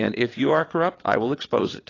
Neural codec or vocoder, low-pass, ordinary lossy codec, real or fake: vocoder, 22.05 kHz, 80 mel bands, WaveNeXt; 7.2 kHz; AAC, 32 kbps; fake